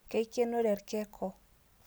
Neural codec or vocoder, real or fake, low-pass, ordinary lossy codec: none; real; none; none